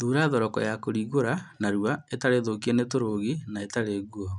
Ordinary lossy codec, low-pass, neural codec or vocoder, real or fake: none; 10.8 kHz; none; real